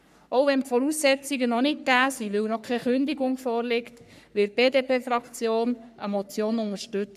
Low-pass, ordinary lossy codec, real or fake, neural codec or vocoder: 14.4 kHz; none; fake; codec, 44.1 kHz, 3.4 kbps, Pupu-Codec